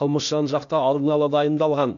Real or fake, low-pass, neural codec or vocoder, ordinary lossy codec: fake; 7.2 kHz; codec, 16 kHz, 0.8 kbps, ZipCodec; AAC, 64 kbps